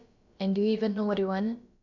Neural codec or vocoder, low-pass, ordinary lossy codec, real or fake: codec, 16 kHz, about 1 kbps, DyCAST, with the encoder's durations; 7.2 kHz; none; fake